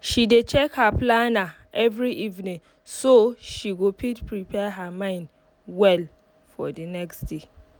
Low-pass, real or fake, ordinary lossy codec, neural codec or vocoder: none; real; none; none